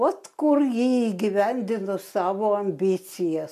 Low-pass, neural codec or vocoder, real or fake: 14.4 kHz; none; real